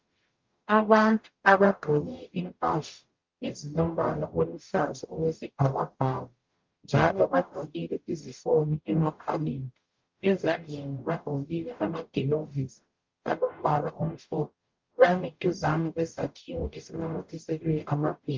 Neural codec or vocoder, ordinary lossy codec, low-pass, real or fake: codec, 44.1 kHz, 0.9 kbps, DAC; Opus, 32 kbps; 7.2 kHz; fake